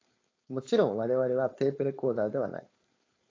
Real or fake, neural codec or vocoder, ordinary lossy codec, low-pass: fake; codec, 16 kHz, 4.8 kbps, FACodec; AAC, 32 kbps; 7.2 kHz